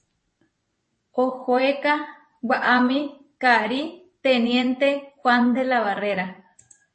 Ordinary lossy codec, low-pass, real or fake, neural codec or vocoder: MP3, 32 kbps; 10.8 kHz; fake; vocoder, 24 kHz, 100 mel bands, Vocos